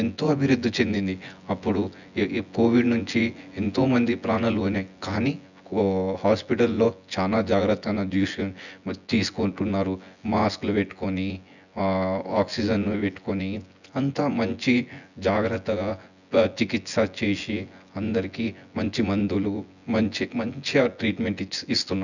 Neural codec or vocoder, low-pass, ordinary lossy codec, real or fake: vocoder, 24 kHz, 100 mel bands, Vocos; 7.2 kHz; none; fake